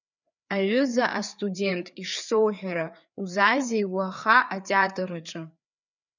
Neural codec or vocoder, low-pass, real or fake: codec, 16 kHz, 4 kbps, FreqCodec, larger model; 7.2 kHz; fake